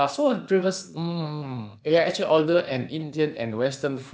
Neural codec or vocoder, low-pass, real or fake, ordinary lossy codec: codec, 16 kHz, 0.8 kbps, ZipCodec; none; fake; none